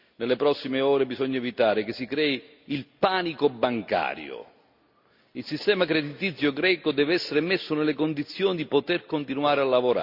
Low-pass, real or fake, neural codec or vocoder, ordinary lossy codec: 5.4 kHz; real; none; Opus, 64 kbps